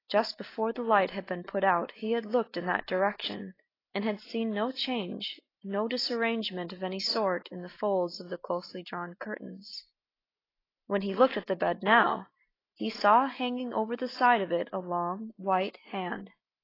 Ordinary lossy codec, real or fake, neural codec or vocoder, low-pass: AAC, 24 kbps; real; none; 5.4 kHz